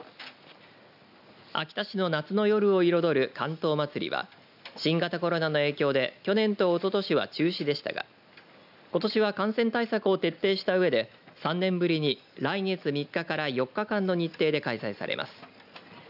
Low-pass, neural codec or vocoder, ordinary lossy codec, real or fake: 5.4 kHz; none; none; real